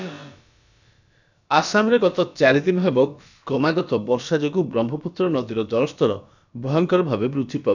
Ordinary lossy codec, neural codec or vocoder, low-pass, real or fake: none; codec, 16 kHz, about 1 kbps, DyCAST, with the encoder's durations; 7.2 kHz; fake